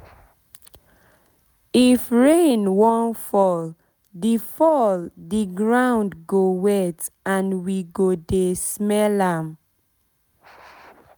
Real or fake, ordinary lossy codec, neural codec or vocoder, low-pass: real; none; none; none